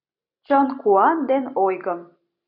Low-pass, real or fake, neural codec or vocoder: 5.4 kHz; real; none